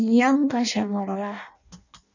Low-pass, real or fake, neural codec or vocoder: 7.2 kHz; fake; codec, 16 kHz in and 24 kHz out, 0.6 kbps, FireRedTTS-2 codec